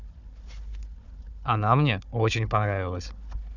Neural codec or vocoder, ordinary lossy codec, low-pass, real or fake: codec, 16 kHz, 4 kbps, FunCodec, trained on Chinese and English, 50 frames a second; none; 7.2 kHz; fake